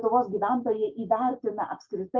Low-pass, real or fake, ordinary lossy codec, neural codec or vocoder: 7.2 kHz; real; Opus, 32 kbps; none